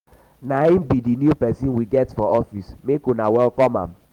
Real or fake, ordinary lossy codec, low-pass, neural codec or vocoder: fake; Opus, 24 kbps; 19.8 kHz; vocoder, 44.1 kHz, 128 mel bands every 512 samples, BigVGAN v2